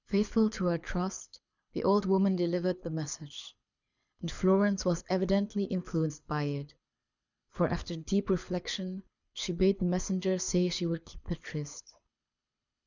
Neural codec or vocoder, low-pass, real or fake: codec, 24 kHz, 6 kbps, HILCodec; 7.2 kHz; fake